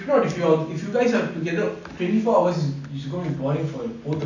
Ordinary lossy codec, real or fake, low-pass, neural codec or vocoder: none; real; 7.2 kHz; none